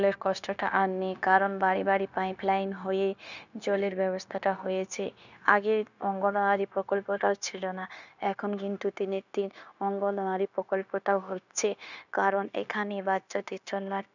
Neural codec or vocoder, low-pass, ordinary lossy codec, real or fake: codec, 16 kHz, 0.9 kbps, LongCat-Audio-Codec; 7.2 kHz; none; fake